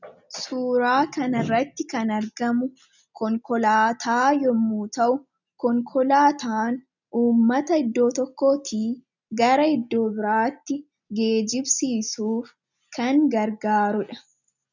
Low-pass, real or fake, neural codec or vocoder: 7.2 kHz; real; none